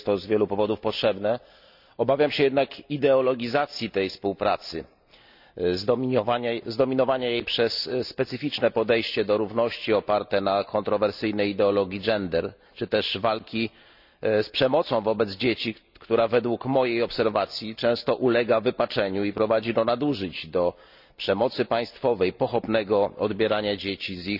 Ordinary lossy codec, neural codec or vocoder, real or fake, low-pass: none; none; real; 5.4 kHz